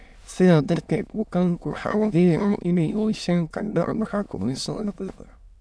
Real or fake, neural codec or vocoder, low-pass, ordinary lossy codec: fake; autoencoder, 22.05 kHz, a latent of 192 numbers a frame, VITS, trained on many speakers; none; none